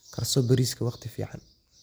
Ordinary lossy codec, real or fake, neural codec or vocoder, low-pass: none; real; none; none